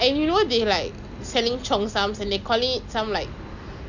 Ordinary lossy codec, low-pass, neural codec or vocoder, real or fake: none; 7.2 kHz; none; real